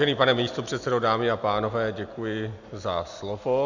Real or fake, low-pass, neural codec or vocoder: real; 7.2 kHz; none